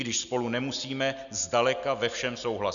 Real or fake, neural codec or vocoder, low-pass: real; none; 7.2 kHz